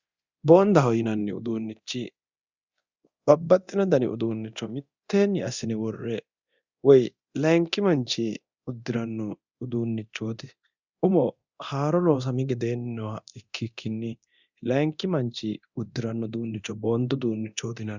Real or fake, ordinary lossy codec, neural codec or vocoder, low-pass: fake; Opus, 64 kbps; codec, 24 kHz, 0.9 kbps, DualCodec; 7.2 kHz